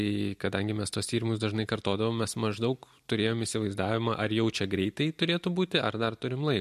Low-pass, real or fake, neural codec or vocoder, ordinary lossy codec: 14.4 kHz; real; none; MP3, 64 kbps